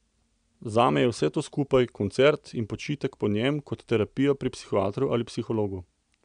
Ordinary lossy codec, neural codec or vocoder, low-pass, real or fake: none; none; 9.9 kHz; real